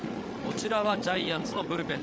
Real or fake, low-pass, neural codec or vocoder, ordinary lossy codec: fake; none; codec, 16 kHz, 16 kbps, FreqCodec, larger model; none